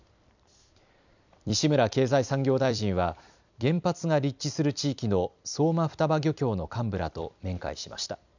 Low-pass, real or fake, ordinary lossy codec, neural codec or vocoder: 7.2 kHz; fake; none; vocoder, 44.1 kHz, 128 mel bands every 256 samples, BigVGAN v2